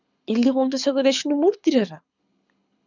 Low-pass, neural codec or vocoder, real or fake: 7.2 kHz; codec, 24 kHz, 6 kbps, HILCodec; fake